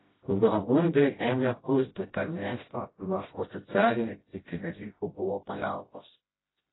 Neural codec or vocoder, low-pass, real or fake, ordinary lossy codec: codec, 16 kHz, 0.5 kbps, FreqCodec, smaller model; 7.2 kHz; fake; AAC, 16 kbps